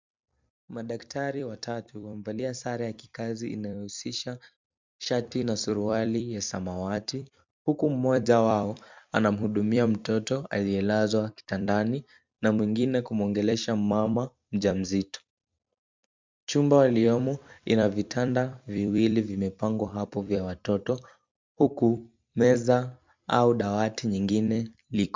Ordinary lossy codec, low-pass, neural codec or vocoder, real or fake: MP3, 64 kbps; 7.2 kHz; vocoder, 44.1 kHz, 128 mel bands every 256 samples, BigVGAN v2; fake